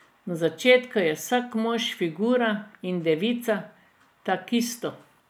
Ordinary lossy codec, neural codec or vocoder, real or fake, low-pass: none; none; real; none